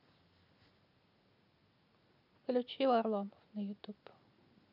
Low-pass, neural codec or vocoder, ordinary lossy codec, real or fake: 5.4 kHz; none; none; real